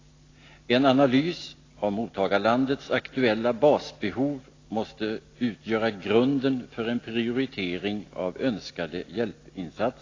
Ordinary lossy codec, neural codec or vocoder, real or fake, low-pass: AAC, 32 kbps; none; real; 7.2 kHz